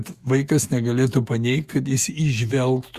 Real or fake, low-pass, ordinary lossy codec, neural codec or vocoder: fake; 14.4 kHz; Opus, 64 kbps; autoencoder, 48 kHz, 128 numbers a frame, DAC-VAE, trained on Japanese speech